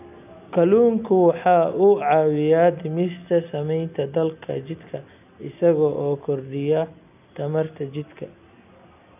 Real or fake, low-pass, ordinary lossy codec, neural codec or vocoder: real; 3.6 kHz; none; none